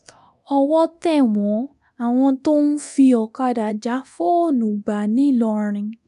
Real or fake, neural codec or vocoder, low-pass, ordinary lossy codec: fake; codec, 24 kHz, 0.9 kbps, DualCodec; 10.8 kHz; MP3, 96 kbps